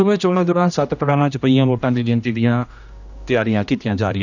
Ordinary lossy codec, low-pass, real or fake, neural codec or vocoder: none; 7.2 kHz; fake; codec, 16 kHz, 1 kbps, X-Codec, HuBERT features, trained on general audio